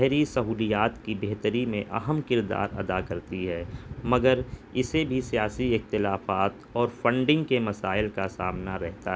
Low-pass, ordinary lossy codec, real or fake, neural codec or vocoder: none; none; real; none